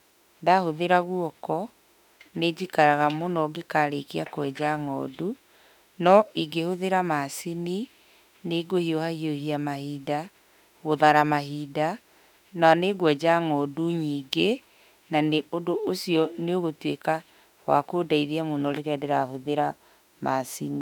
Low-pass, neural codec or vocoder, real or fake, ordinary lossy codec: 19.8 kHz; autoencoder, 48 kHz, 32 numbers a frame, DAC-VAE, trained on Japanese speech; fake; none